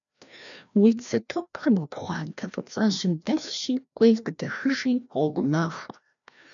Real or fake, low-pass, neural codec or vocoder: fake; 7.2 kHz; codec, 16 kHz, 1 kbps, FreqCodec, larger model